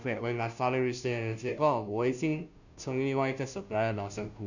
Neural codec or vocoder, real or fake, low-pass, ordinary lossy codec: codec, 16 kHz, 0.5 kbps, FunCodec, trained on Chinese and English, 25 frames a second; fake; 7.2 kHz; none